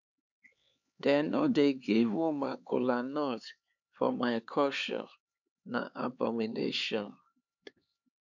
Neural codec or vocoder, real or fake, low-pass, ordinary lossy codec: codec, 16 kHz, 4 kbps, X-Codec, HuBERT features, trained on LibriSpeech; fake; 7.2 kHz; none